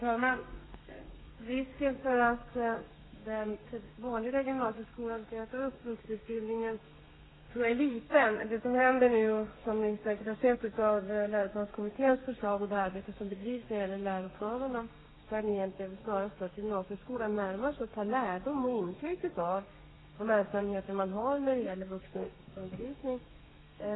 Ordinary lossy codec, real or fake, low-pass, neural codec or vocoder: AAC, 16 kbps; fake; 7.2 kHz; codec, 32 kHz, 1.9 kbps, SNAC